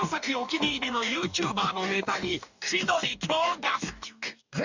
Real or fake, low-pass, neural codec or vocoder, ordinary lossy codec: fake; 7.2 kHz; codec, 44.1 kHz, 2.6 kbps, DAC; Opus, 64 kbps